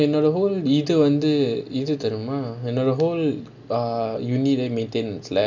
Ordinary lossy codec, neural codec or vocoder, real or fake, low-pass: none; none; real; 7.2 kHz